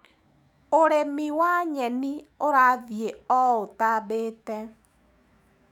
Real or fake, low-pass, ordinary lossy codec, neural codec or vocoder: fake; 19.8 kHz; none; autoencoder, 48 kHz, 128 numbers a frame, DAC-VAE, trained on Japanese speech